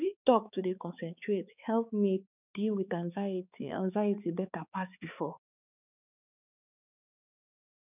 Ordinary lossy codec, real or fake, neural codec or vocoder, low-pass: none; fake; codec, 16 kHz, 4 kbps, X-Codec, HuBERT features, trained on balanced general audio; 3.6 kHz